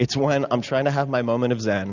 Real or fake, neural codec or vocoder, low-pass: real; none; 7.2 kHz